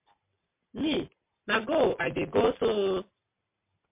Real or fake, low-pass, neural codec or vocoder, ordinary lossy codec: real; 3.6 kHz; none; MP3, 24 kbps